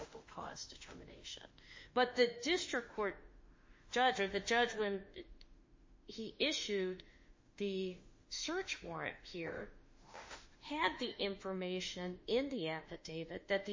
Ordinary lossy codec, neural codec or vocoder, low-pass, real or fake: MP3, 32 kbps; autoencoder, 48 kHz, 32 numbers a frame, DAC-VAE, trained on Japanese speech; 7.2 kHz; fake